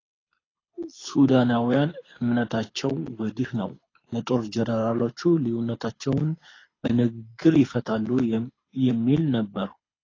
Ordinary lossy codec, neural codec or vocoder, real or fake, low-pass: AAC, 32 kbps; codec, 24 kHz, 6 kbps, HILCodec; fake; 7.2 kHz